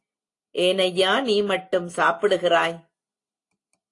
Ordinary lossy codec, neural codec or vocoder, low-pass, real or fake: AAC, 48 kbps; none; 10.8 kHz; real